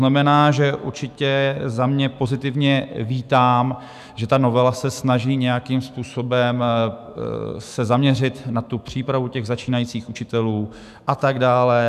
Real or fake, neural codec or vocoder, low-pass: fake; autoencoder, 48 kHz, 128 numbers a frame, DAC-VAE, trained on Japanese speech; 14.4 kHz